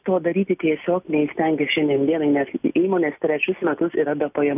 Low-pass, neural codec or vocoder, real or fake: 3.6 kHz; none; real